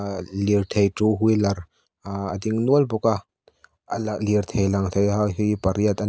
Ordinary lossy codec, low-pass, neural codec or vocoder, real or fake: none; none; none; real